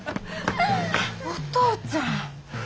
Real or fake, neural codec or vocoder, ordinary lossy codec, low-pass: real; none; none; none